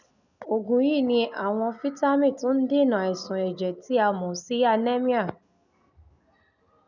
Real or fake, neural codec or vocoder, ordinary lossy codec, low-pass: real; none; none; 7.2 kHz